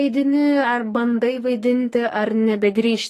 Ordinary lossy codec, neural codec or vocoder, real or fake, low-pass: AAC, 48 kbps; codec, 44.1 kHz, 2.6 kbps, SNAC; fake; 14.4 kHz